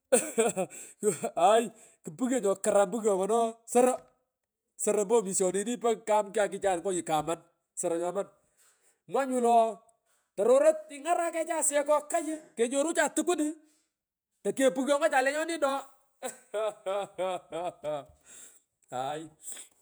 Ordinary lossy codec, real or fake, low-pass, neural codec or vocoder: none; fake; none; vocoder, 48 kHz, 128 mel bands, Vocos